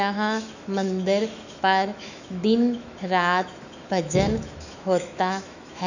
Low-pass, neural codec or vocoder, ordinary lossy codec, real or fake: 7.2 kHz; autoencoder, 48 kHz, 128 numbers a frame, DAC-VAE, trained on Japanese speech; none; fake